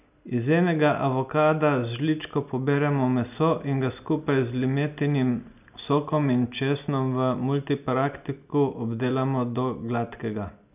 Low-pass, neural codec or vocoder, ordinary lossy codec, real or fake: 3.6 kHz; none; none; real